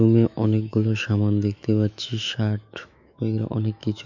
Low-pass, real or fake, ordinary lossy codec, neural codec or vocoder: 7.2 kHz; fake; none; autoencoder, 48 kHz, 128 numbers a frame, DAC-VAE, trained on Japanese speech